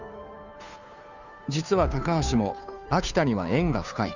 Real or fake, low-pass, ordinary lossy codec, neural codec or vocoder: fake; 7.2 kHz; none; codec, 16 kHz, 2 kbps, FunCodec, trained on Chinese and English, 25 frames a second